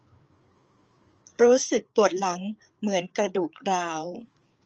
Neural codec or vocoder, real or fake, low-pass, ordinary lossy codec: codec, 16 kHz, 4 kbps, FreqCodec, larger model; fake; 7.2 kHz; Opus, 24 kbps